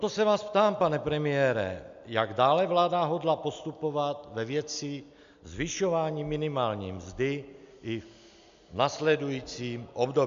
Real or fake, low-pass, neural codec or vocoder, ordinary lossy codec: real; 7.2 kHz; none; MP3, 64 kbps